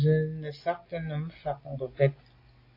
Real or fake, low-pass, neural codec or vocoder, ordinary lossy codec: real; 5.4 kHz; none; AAC, 32 kbps